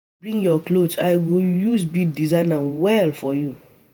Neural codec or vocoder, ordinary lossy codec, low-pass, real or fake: vocoder, 48 kHz, 128 mel bands, Vocos; none; none; fake